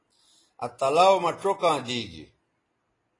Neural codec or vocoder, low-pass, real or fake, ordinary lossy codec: none; 10.8 kHz; real; AAC, 32 kbps